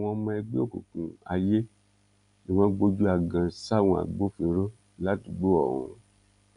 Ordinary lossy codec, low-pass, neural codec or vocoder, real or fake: none; 10.8 kHz; none; real